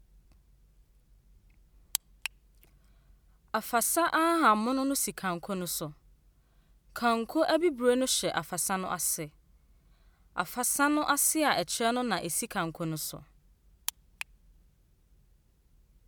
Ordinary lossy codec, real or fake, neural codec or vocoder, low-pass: none; real; none; none